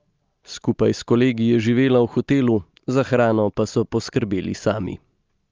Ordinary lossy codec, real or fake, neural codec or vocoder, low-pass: Opus, 24 kbps; real; none; 7.2 kHz